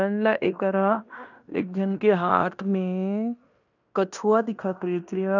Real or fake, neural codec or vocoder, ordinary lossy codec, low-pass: fake; codec, 16 kHz in and 24 kHz out, 0.9 kbps, LongCat-Audio-Codec, fine tuned four codebook decoder; none; 7.2 kHz